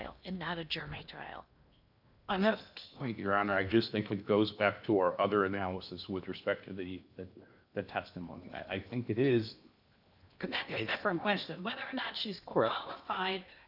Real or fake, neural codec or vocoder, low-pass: fake; codec, 16 kHz in and 24 kHz out, 0.8 kbps, FocalCodec, streaming, 65536 codes; 5.4 kHz